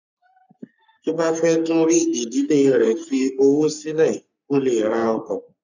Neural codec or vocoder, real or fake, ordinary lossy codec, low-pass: codec, 44.1 kHz, 3.4 kbps, Pupu-Codec; fake; none; 7.2 kHz